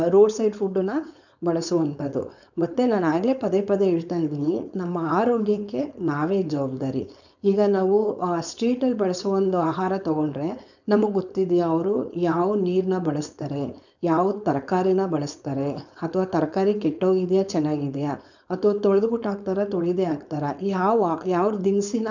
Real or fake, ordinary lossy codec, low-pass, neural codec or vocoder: fake; none; 7.2 kHz; codec, 16 kHz, 4.8 kbps, FACodec